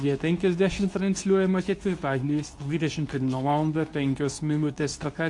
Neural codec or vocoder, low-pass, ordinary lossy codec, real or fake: codec, 24 kHz, 0.9 kbps, WavTokenizer, medium speech release version 2; 10.8 kHz; AAC, 64 kbps; fake